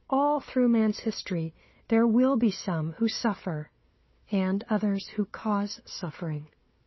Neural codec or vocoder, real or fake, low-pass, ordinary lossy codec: codec, 16 kHz, 4 kbps, FunCodec, trained on Chinese and English, 50 frames a second; fake; 7.2 kHz; MP3, 24 kbps